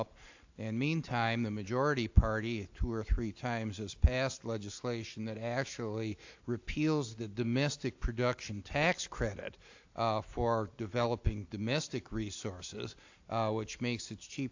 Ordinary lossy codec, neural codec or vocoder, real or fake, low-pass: AAC, 48 kbps; none; real; 7.2 kHz